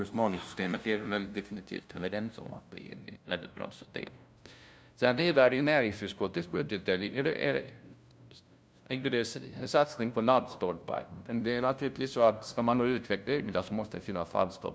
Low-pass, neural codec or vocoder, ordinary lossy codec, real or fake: none; codec, 16 kHz, 0.5 kbps, FunCodec, trained on LibriTTS, 25 frames a second; none; fake